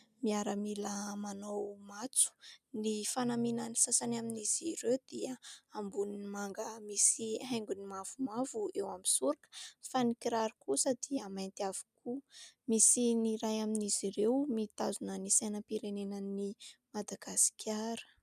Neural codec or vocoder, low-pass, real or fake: none; 14.4 kHz; real